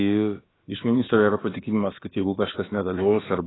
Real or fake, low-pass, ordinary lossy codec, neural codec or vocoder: fake; 7.2 kHz; AAC, 16 kbps; codec, 16 kHz, about 1 kbps, DyCAST, with the encoder's durations